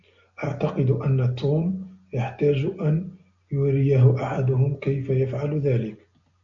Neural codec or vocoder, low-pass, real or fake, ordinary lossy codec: none; 7.2 kHz; real; MP3, 96 kbps